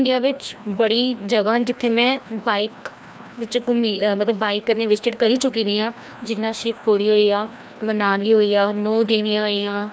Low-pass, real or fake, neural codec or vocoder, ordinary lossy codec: none; fake; codec, 16 kHz, 1 kbps, FreqCodec, larger model; none